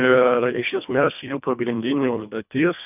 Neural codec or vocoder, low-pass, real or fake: codec, 24 kHz, 1.5 kbps, HILCodec; 3.6 kHz; fake